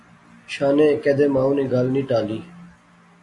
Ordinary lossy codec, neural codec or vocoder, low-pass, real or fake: AAC, 48 kbps; none; 10.8 kHz; real